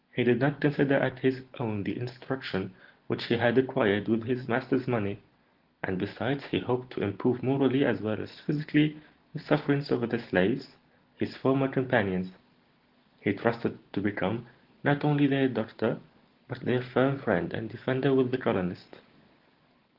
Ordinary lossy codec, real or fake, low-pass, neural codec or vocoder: Opus, 16 kbps; real; 5.4 kHz; none